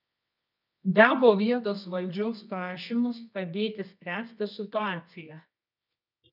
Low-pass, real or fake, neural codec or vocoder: 5.4 kHz; fake; codec, 24 kHz, 0.9 kbps, WavTokenizer, medium music audio release